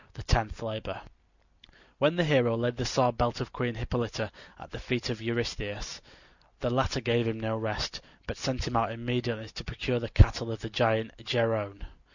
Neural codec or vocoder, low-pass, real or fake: none; 7.2 kHz; real